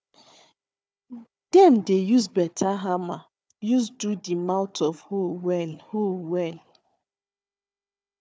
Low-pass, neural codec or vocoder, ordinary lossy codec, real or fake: none; codec, 16 kHz, 4 kbps, FunCodec, trained on Chinese and English, 50 frames a second; none; fake